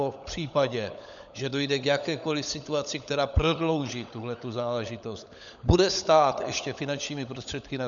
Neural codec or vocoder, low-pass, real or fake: codec, 16 kHz, 16 kbps, FunCodec, trained on LibriTTS, 50 frames a second; 7.2 kHz; fake